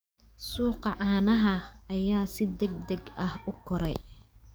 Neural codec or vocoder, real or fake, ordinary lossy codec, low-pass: codec, 44.1 kHz, 7.8 kbps, DAC; fake; none; none